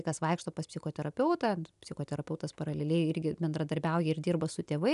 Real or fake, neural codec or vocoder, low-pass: real; none; 10.8 kHz